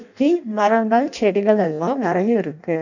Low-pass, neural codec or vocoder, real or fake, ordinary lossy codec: 7.2 kHz; codec, 16 kHz in and 24 kHz out, 0.6 kbps, FireRedTTS-2 codec; fake; none